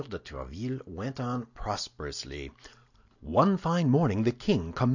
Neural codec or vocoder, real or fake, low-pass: none; real; 7.2 kHz